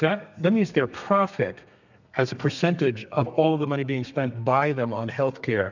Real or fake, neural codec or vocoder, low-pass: fake; codec, 32 kHz, 1.9 kbps, SNAC; 7.2 kHz